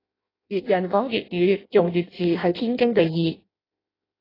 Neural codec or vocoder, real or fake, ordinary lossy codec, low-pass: codec, 16 kHz in and 24 kHz out, 0.6 kbps, FireRedTTS-2 codec; fake; AAC, 24 kbps; 5.4 kHz